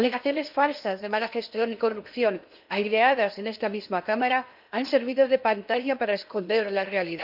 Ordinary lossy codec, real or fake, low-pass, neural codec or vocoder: none; fake; 5.4 kHz; codec, 16 kHz in and 24 kHz out, 0.8 kbps, FocalCodec, streaming, 65536 codes